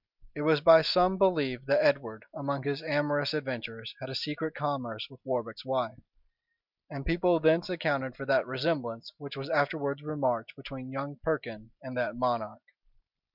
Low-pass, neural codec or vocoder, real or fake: 5.4 kHz; none; real